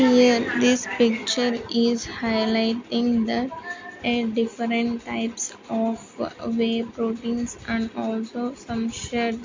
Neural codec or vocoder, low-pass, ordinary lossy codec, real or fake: none; 7.2 kHz; MP3, 48 kbps; real